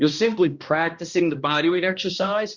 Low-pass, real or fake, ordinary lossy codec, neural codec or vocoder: 7.2 kHz; fake; Opus, 64 kbps; codec, 16 kHz, 1 kbps, X-Codec, HuBERT features, trained on balanced general audio